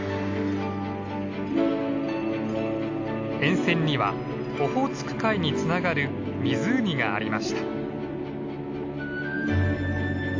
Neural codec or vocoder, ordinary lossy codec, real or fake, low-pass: none; AAC, 48 kbps; real; 7.2 kHz